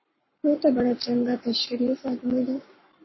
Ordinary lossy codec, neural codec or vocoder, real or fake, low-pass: MP3, 24 kbps; codec, 44.1 kHz, 7.8 kbps, Pupu-Codec; fake; 7.2 kHz